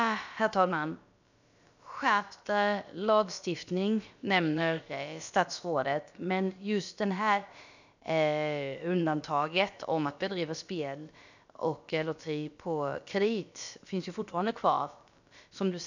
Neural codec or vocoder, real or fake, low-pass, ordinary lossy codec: codec, 16 kHz, about 1 kbps, DyCAST, with the encoder's durations; fake; 7.2 kHz; none